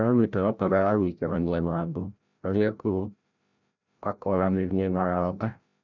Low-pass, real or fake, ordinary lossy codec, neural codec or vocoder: 7.2 kHz; fake; none; codec, 16 kHz, 0.5 kbps, FreqCodec, larger model